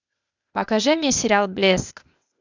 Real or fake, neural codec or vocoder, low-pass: fake; codec, 16 kHz, 0.8 kbps, ZipCodec; 7.2 kHz